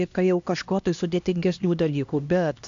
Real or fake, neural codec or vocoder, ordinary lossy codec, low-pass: fake; codec, 16 kHz, 1 kbps, X-Codec, HuBERT features, trained on LibriSpeech; AAC, 64 kbps; 7.2 kHz